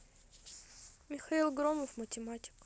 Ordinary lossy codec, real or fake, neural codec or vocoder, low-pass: none; real; none; none